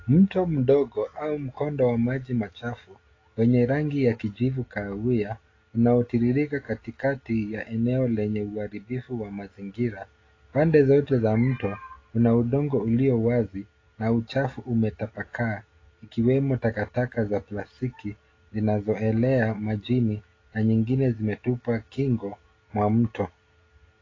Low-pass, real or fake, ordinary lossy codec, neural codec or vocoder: 7.2 kHz; real; AAC, 32 kbps; none